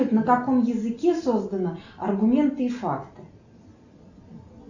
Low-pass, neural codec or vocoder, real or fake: 7.2 kHz; none; real